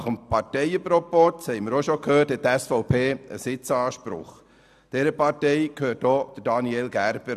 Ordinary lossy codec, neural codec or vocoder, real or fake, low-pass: none; none; real; 14.4 kHz